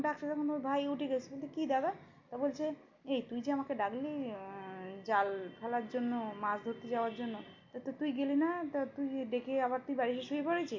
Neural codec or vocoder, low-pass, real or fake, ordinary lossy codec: none; 7.2 kHz; real; MP3, 48 kbps